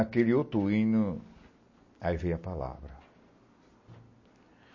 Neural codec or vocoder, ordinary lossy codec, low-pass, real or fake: none; MP3, 32 kbps; 7.2 kHz; real